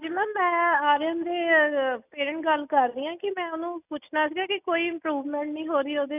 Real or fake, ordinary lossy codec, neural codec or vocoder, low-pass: real; none; none; 3.6 kHz